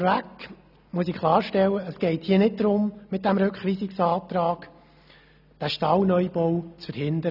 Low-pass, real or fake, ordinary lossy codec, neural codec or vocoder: 5.4 kHz; real; none; none